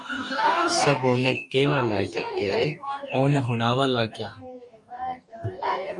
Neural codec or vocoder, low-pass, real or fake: codec, 44.1 kHz, 2.6 kbps, DAC; 10.8 kHz; fake